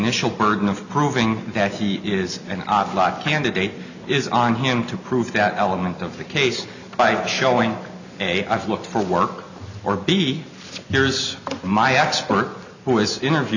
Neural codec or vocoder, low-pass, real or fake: none; 7.2 kHz; real